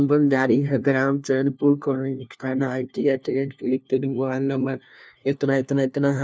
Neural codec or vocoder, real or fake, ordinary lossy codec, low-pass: codec, 16 kHz, 1 kbps, FunCodec, trained on LibriTTS, 50 frames a second; fake; none; none